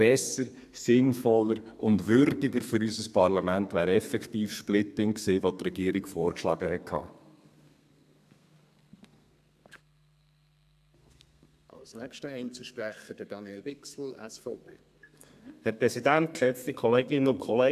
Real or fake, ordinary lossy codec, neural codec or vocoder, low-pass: fake; AAC, 96 kbps; codec, 32 kHz, 1.9 kbps, SNAC; 14.4 kHz